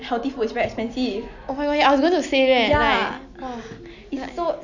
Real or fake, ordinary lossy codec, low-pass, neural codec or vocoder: real; none; 7.2 kHz; none